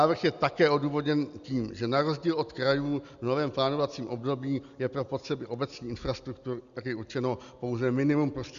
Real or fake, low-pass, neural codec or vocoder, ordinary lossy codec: real; 7.2 kHz; none; Opus, 64 kbps